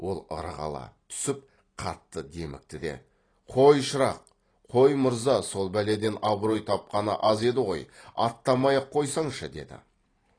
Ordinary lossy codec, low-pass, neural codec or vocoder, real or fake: AAC, 32 kbps; 9.9 kHz; vocoder, 44.1 kHz, 128 mel bands every 256 samples, BigVGAN v2; fake